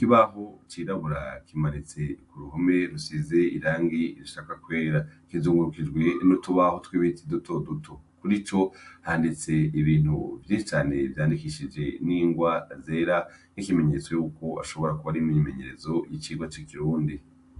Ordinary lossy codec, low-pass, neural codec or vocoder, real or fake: AAC, 64 kbps; 10.8 kHz; none; real